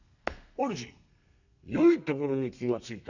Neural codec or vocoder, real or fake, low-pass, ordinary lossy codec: codec, 32 kHz, 1.9 kbps, SNAC; fake; 7.2 kHz; none